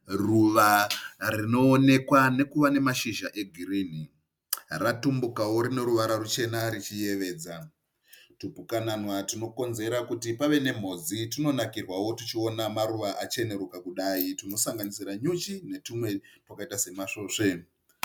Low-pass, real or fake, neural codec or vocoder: 19.8 kHz; real; none